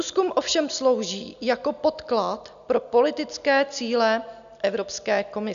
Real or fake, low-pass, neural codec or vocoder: real; 7.2 kHz; none